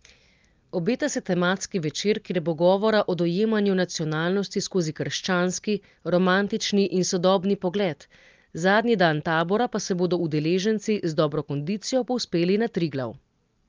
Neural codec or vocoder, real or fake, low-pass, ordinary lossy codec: none; real; 7.2 kHz; Opus, 24 kbps